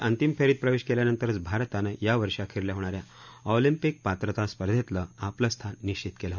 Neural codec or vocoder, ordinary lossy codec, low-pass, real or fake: none; none; 7.2 kHz; real